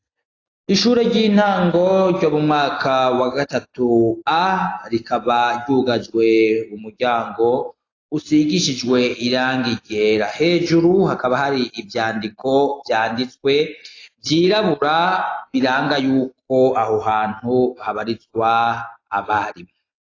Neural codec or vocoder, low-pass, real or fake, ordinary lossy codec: none; 7.2 kHz; real; AAC, 32 kbps